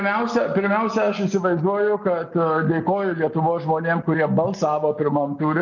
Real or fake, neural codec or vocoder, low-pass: real; none; 7.2 kHz